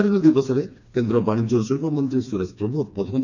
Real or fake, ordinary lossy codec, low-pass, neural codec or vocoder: fake; none; 7.2 kHz; codec, 16 kHz, 2 kbps, FreqCodec, smaller model